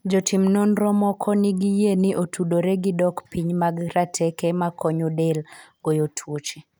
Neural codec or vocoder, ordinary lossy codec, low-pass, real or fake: none; none; none; real